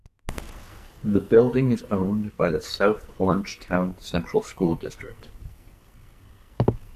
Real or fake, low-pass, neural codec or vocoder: fake; 14.4 kHz; codec, 44.1 kHz, 2.6 kbps, SNAC